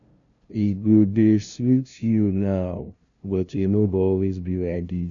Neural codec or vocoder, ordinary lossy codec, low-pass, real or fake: codec, 16 kHz, 0.5 kbps, FunCodec, trained on LibriTTS, 25 frames a second; none; 7.2 kHz; fake